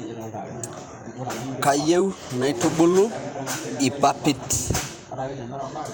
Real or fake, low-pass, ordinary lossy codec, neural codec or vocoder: fake; none; none; vocoder, 44.1 kHz, 128 mel bands, Pupu-Vocoder